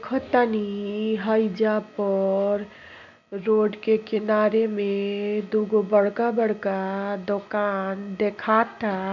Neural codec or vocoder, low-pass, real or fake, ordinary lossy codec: none; 7.2 kHz; real; none